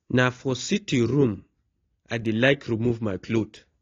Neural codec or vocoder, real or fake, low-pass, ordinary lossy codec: none; real; 7.2 kHz; AAC, 32 kbps